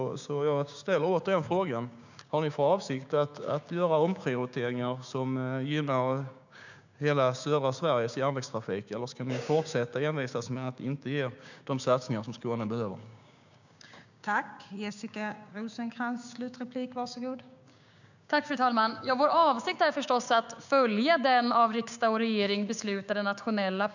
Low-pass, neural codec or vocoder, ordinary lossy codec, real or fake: 7.2 kHz; codec, 16 kHz, 6 kbps, DAC; none; fake